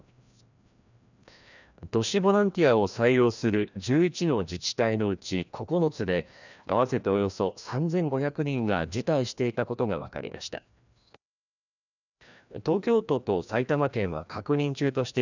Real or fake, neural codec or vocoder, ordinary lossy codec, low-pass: fake; codec, 16 kHz, 1 kbps, FreqCodec, larger model; none; 7.2 kHz